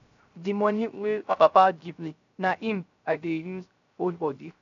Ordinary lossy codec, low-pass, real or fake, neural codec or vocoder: none; 7.2 kHz; fake; codec, 16 kHz, 0.3 kbps, FocalCodec